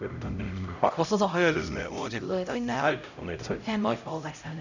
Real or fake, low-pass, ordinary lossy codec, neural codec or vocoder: fake; 7.2 kHz; none; codec, 16 kHz, 0.5 kbps, X-Codec, HuBERT features, trained on LibriSpeech